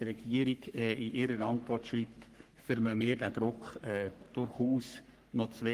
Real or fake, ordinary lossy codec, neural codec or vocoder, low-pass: fake; Opus, 24 kbps; codec, 44.1 kHz, 3.4 kbps, Pupu-Codec; 14.4 kHz